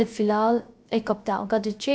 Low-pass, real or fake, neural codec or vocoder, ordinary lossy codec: none; fake; codec, 16 kHz, 0.3 kbps, FocalCodec; none